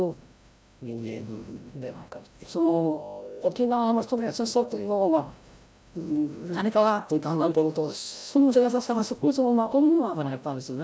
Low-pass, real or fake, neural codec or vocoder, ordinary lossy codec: none; fake; codec, 16 kHz, 0.5 kbps, FreqCodec, larger model; none